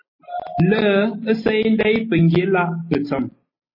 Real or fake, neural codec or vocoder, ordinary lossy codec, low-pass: real; none; MP3, 24 kbps; 5.4 kHz